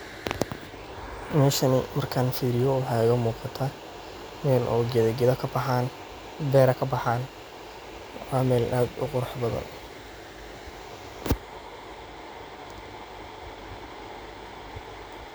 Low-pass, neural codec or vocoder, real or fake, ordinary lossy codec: none; none; real; none